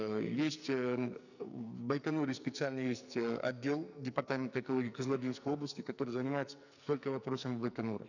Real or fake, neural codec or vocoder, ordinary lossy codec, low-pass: fake; codec, 44.1 kHz, 2.6 kbps, SNAC; none; 7.2 kHz